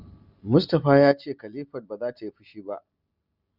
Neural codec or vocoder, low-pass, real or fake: none; 5.4 kHz; real